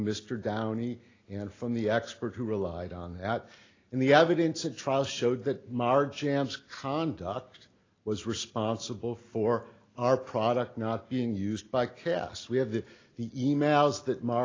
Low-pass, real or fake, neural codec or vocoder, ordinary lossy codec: 7.2 kHz; real; none; AAC, 32 kbps